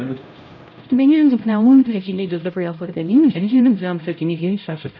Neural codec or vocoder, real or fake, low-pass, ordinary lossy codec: codec, 16 kHz, 0.5 kbps, X-Codec, HuBERT features, trained on LibriSpeech; fake; 7.2 kHz; none